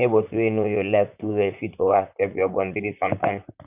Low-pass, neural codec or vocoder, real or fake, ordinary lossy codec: 3.6 kHz; vocoder, 44.1 kHz, 128 mel bands, Pupu-Vocoder; fake; none